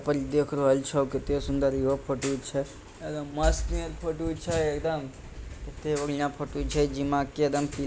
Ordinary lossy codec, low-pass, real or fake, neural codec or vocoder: none; none; real; none